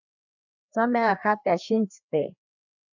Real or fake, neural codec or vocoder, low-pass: fake; codec, 16 kHz, 2 kbps, FreqCodec, larger model; 7.2 kHz